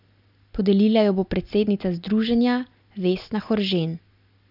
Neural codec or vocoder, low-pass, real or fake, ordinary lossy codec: none; 5.4 kHz; real; none